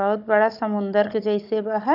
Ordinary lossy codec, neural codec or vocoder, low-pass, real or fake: none; codec, 16 kHz, 16 kbps, FunCodec, trained on Chinese and English, 50 frames a second; 5.4 kHz; fake